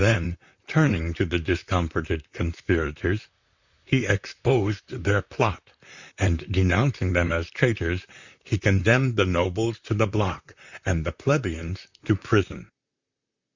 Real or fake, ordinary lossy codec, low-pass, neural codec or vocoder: fake; Opus, 64 kbps; 7.2 kHz; vocoder, 44.1 kHz, 128 mel bands, Pupu-Vocoder